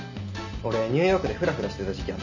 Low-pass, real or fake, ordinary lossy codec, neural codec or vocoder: 7.2 kHz; real; none; none